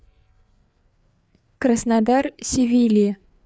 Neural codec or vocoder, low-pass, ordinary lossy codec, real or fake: codec, 16 kHz, 4 kbps, FreqCodec, larger model; none; none; fake